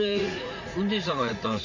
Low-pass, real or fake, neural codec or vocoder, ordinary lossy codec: 7.2 kHz; fake; vocoder, 44.1 kHz, 80 mel bands, Vocos; none